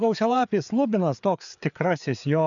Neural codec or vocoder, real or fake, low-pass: codec, 16 kHz, 4 kbps, FreqCodec, larger model; fake; 7.2 kHz